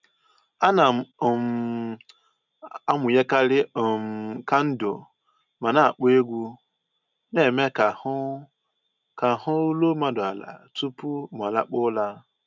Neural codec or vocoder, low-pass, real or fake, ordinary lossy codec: none; 7.2 kHz; real; none